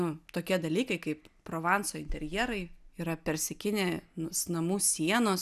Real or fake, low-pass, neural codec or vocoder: real; 14.4 kHz; none